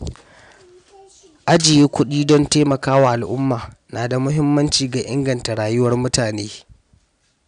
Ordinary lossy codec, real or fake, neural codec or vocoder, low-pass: none; real; none; 9.9 kHz